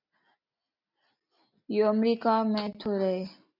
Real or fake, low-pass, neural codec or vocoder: real; 5.4 kHz; none